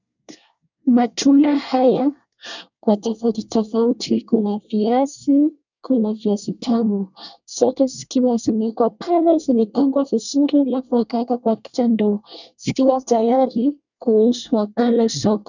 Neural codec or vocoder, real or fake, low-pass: codec, 24 kHz, 1 kbps, SNAC; fake; 7.2 kHz